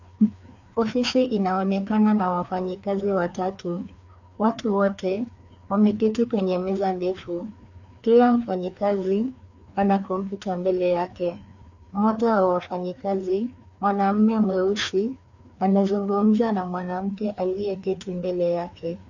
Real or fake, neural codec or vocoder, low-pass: fake; codec, 16 kHz, 2 kbps, FreqCodec, larger model; 7.2 kHz